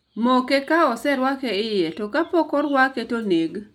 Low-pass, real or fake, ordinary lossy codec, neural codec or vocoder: 19.8 kHz; real; none; none